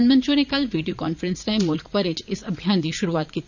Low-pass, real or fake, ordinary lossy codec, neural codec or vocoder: 7.2 kHz; fake; none; vocoder, 44.1 kHz, 80 mel bands, Vocos